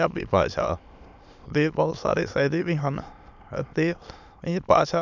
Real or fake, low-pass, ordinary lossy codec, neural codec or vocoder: fake; 7.2 kHz; none; autoencoder, 22.05 kHz, a latent of 192 numbers a frame, VITS, trained on many speakers